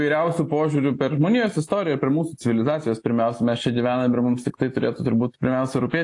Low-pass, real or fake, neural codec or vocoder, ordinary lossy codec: 10.8 kHz; real; none; AAC, 48 kbps